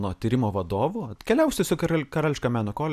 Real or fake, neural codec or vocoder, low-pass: real; none; 14.4 kHz